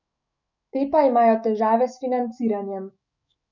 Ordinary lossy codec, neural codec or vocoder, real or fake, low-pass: none; autoencoder, 48 kHz, 128 numbers a frame, DAC-VAE, trained on Japanese speech; fake; 7.2 kHz